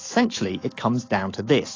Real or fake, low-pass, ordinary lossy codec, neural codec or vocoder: fake; 7.2 kHz; AAC, 32 kbps; autoencoder, 48 kHz, 128 numbers a frame, DAC-VAE, trained on Japanese speech